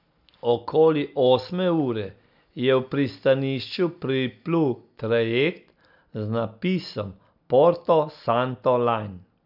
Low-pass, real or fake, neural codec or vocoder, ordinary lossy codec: 5.4 kHz; real; none; none